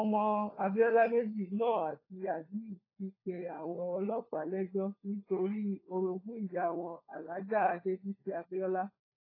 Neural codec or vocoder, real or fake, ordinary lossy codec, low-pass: codec, 16 kHz, 4 kbps, FunCodec, trained on LibriTTS, 50 frames a second; fake; AAC, 24 kbps; 5.4 kHz